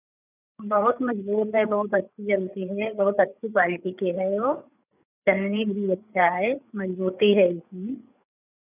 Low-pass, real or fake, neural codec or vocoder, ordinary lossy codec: 3.6 kHz; fake; codec, 16 kHz, 8 kbps, FreqCodec, larger model; none